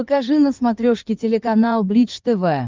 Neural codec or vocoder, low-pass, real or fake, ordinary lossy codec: codec, 16 kHz in and 24 kHz out, 2.2 kbps, FireRedTTS-2 codec; 7.2 kHz; fake; Opus, 32 kbps